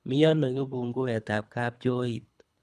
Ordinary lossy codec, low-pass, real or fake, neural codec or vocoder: none; none; fake; codec, 24 kHz, 3 kbps, HILCodec